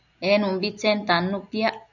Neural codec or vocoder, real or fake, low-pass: none; real; 7.2 kHz